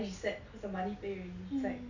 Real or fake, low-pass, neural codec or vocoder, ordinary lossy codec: real; 7.2 kHz; none; none